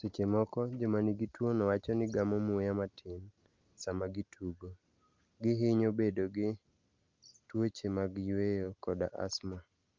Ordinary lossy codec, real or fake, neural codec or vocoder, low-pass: Opus, 24 kbps; real; none; 7.2 kHz